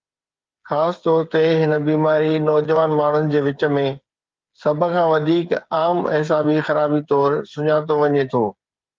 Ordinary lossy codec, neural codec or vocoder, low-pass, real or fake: Opus, 32 kbps; codec, 16 kHz, 8 kbps, FreqCodec, smaller model; 7.2 kHz; fake